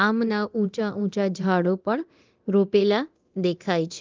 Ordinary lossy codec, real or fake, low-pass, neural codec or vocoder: Opus, 32 kbps; fake; 7.2 kHz; codec, 24 kHz, 0.9 kbps, DualCodec